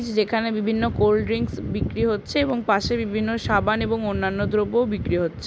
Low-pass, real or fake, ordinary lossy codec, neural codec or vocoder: none; real; none; none